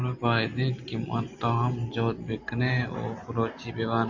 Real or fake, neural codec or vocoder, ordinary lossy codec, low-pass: real; none; AAC, 48 kbps; 7.2 kHz